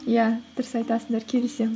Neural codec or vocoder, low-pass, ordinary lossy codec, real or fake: none; none; none; real